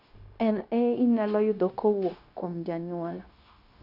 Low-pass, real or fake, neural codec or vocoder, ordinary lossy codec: 5.4 kHz; fake; codec, 16 kHz, 0.9 kbps, LongCat-Audio-Codec; none